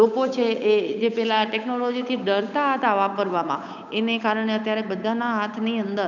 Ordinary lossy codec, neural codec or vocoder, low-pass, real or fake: none; codec, 16 kHz, 8 kbps, FunCodec, trained on Chinese and English, 25 frames a second; 7.2 kHz; fake